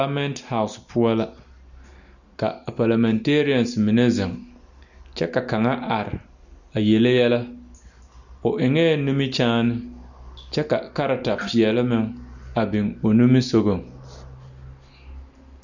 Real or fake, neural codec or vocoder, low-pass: real; none; 7.2 kHz